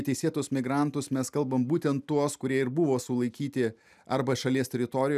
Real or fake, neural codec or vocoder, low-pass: real; none; 14.4 kHz